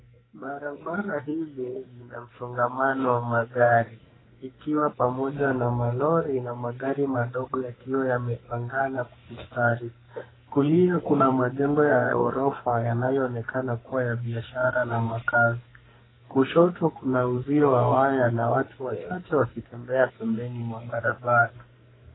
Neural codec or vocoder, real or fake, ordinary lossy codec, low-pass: codec, 44.1 kHz, 2.6 kbps, SNAC; fake; AAC, 16 kbps; 7.2 kHz